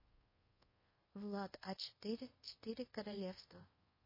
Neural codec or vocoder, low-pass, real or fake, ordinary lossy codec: codec, 24 kHz, 0.5 kbps, DualCodec; 5.4 kHz; fake; MP3, 24 kbps